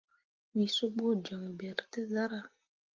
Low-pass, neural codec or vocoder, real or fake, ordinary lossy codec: 7.2 kHz; none; real; Opus, 32 kbps